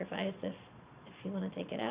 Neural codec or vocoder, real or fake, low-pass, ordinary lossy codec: vocoder, 22.05 kHz, 80 mel bands, Vocos; fake; 3.6 kHz; Opus, 64 kbps